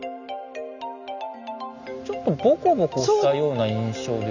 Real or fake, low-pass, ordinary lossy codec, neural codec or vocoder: real; 7.2 kHz; none; none